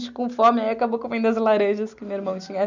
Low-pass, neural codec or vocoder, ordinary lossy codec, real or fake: 7.2 kHz; none; none; real